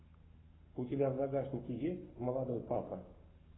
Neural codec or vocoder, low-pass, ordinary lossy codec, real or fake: codec, 44.1 kHz, 7.8 kbps, Pupu-Codec; 7.2 kHz; AAC, 16 kbps; fake